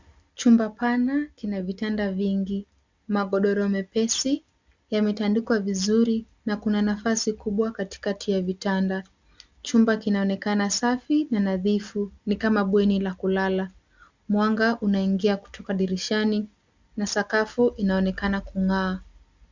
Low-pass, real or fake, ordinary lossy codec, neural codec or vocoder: 7.2 kHz; real; Opus, 64 kbps; none